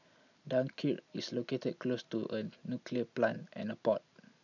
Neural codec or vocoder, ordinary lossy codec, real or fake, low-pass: none; none; real; 7.2 kHz